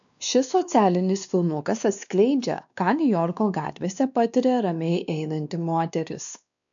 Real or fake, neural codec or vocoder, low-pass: fake; codec, 16 kHz, 2 kbps, X-Codec, WavLM features, trained on Multilingual LibriSpeech; 7.2 kHz